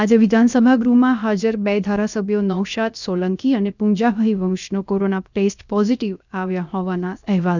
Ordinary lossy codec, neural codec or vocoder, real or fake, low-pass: none; codec, 16 kHz, 0.7 kbps, FocalCodec; fake; 7.2 kHz